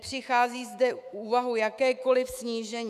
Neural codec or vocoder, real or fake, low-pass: autoencoder, 48 kHz, 128 numbers a frame, DAC-VAE, trained on Japanese speech; fake; 14.4 kHz